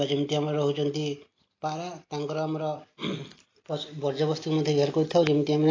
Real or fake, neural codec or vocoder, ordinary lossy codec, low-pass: real; none; AAC, 32 kbps; 7.2 kHz